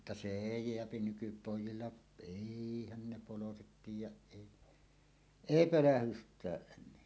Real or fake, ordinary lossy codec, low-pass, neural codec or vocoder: real; none; none; none